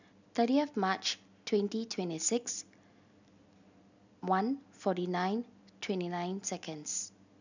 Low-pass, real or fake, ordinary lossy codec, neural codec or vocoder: 7.2 kHz; real; none; none